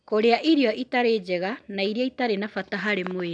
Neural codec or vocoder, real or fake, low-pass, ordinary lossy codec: none; real; 9.9 kHz; none